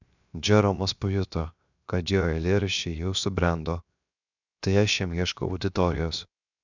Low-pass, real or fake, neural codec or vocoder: 7.2 kHz; fake; codec, 16 kHz, 0.7 kbps, FocalCodec